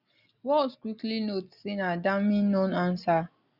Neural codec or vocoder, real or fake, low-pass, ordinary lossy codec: none; real; 5.4 kHz; Opus, 64 kbps